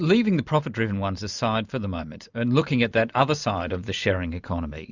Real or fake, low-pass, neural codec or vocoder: real; 7.2 kHz; none